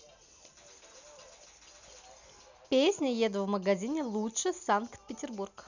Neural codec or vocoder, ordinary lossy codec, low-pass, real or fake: none; none; 7.2 kHz; real